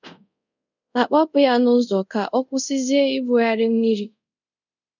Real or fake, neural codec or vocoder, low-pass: fake; codec, 24 kHz, 0.5 kbps, DualCodec; 7.2 kHz